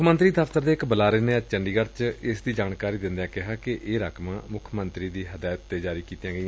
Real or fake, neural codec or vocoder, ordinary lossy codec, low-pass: real; none; none; none